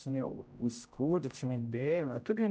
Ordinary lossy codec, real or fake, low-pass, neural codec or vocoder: none; fake; none; codec, 16 kHz, 0.5 kbps, X-Codec, HuBERT features, trained on general audio